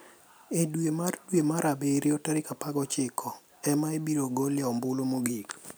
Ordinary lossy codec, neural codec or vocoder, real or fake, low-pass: none; none; real; none